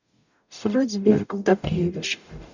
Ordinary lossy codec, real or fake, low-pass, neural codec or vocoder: none; fake; 7.2 kHz; codec, 44.1 kHz, 0.9 kbps, DAC